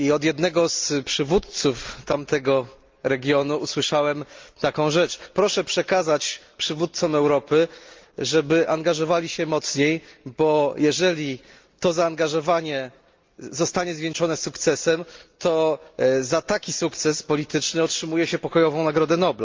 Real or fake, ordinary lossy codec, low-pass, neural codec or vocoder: real; Opus, 32 kbps; 7.2 kHz; none